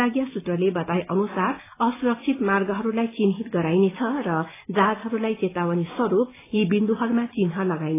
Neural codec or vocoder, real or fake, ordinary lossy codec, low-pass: none; real; AAC, 16 kbps; 3.6 kHz